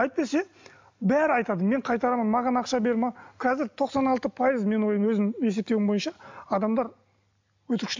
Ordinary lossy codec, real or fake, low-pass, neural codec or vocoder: MP3, 64 kbps; real; 7.2 kHz; none